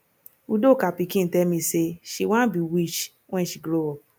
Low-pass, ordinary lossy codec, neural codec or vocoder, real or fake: none; none; none; real